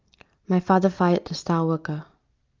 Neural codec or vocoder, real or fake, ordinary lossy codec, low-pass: none; real; Opus, 32 kbps; 7.2 kHz